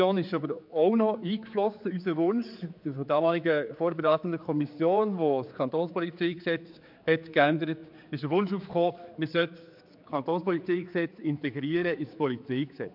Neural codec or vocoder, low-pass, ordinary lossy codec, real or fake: codec, 16 kHz, 4 kbps, X-Codec, HuBERT features, trained on general audio; 5.4 kHz; MP3, 48 kbps; fake